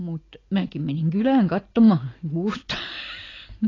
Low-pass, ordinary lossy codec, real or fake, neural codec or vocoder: 7.2 kHz; AAC, 32 kbps; real; none